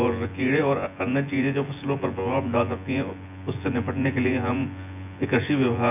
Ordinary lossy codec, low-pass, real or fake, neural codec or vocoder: none; 3.6 kHz; fake; vocoder, 24 kHz, 100 mel bands, Vocos